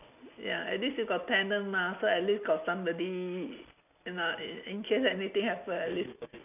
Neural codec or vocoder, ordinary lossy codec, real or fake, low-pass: none; none; real; 3.6 kHz